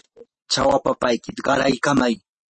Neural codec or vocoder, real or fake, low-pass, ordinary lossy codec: none; real; 10.8 kHz; MP3, 32 kbps